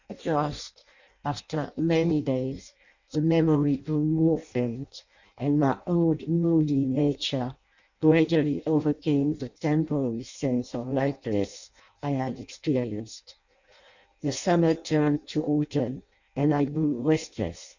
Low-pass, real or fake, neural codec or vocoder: 7.2 kHz; fake; codec, 16 kHz in and 24 kHz out, 0.6 kbps, FireRedTTS-2 codec